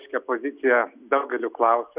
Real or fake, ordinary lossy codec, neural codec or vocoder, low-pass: real; Opus, 64 kbps; none; 3.6 kHz